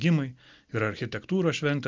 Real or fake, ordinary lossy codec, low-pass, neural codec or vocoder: real; Opus, 24 kbps; 7.2 kHz; none